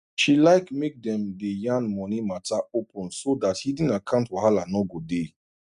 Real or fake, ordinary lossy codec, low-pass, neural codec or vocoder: real; none; 10.8 kHz; none